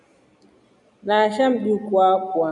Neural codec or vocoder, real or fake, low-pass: none; real; 10.8 kHz